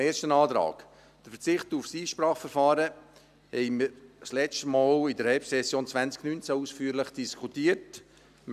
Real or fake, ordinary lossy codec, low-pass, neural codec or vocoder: real; none; 14.4 kHz; none